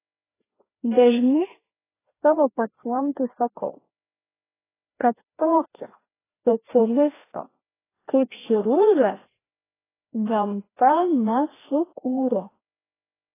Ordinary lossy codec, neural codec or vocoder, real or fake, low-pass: AAC, 16 kbps; codec, 16 kHz, 1 kbps, FreqCodec, larger model; fake; 3.6 kHz